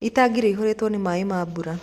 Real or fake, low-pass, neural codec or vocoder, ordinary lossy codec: real; 14.4 kHz; none; Opus, 64 kbps